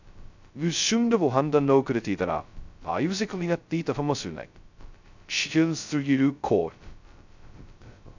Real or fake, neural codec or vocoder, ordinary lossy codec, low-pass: fake; codec, 16 kHz, 0.2 kbps, FocalCodec; none; 7.2 kHz